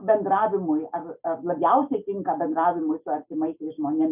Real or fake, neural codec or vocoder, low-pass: real; none; 3.6 kHz